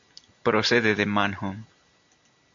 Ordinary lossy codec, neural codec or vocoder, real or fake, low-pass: AAC, 64 kbps; none; real; 7.2 kHz